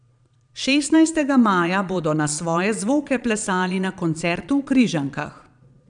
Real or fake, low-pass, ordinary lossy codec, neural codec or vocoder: fake; 9.9 kHz; none; vocoder, 22.05 kHz, 80 mel bands, Vocos